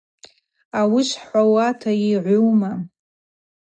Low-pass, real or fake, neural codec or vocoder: 9.9 kHz; real; none